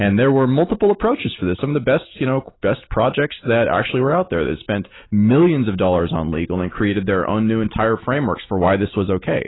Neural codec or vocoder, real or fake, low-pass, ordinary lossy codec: none; real; 7.2 kHz; AAC, 16 kbps